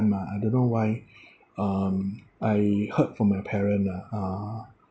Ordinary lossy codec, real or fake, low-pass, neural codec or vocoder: none; real; none; none